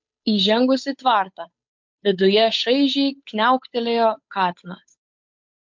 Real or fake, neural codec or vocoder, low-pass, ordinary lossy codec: fake; codec, 16 kHz, 8 kbps, FunCodec, trained on Chinese and English, 25 frames a second; 7.2 kHz; MP3, 48 kbps